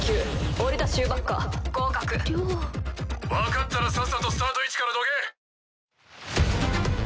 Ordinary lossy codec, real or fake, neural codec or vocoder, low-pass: none; real; none; none